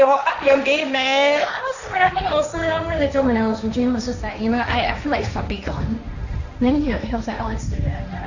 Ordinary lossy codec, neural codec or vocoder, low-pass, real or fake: none; codec, 16 kHz, 1.1 kbps, Voila-Tokenizer; 7.2 kHz; fake